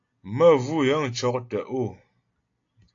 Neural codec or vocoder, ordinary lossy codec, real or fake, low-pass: none; AAC, 48 kbps; real; 7.2 kHz